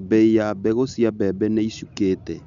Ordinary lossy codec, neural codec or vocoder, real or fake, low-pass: none; none; real; 7.2 kHz